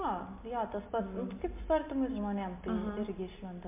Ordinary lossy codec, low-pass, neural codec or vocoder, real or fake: MP3, 32 kbps; 3.6 kHz; none; real